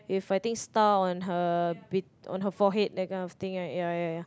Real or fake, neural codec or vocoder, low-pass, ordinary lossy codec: real; none; none; none